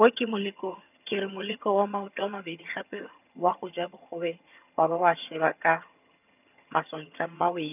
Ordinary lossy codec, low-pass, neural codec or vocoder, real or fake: none; 3.6 kHz; vocoder, 22.05 kHz, 80 mel bands, HiFi-GAN; fake